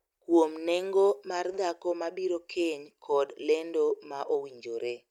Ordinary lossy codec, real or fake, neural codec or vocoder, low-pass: none; real; none; 19.8 kHz